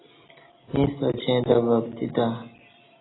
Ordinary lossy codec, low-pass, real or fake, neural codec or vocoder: AAC, 16 kbps; 7.2 kHz; real; none